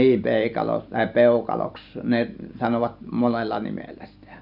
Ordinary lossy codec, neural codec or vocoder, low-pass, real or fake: none; none; 5.4 kHz; real